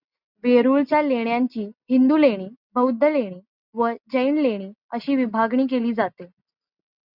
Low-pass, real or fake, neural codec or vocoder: 5.4 kHz; real; none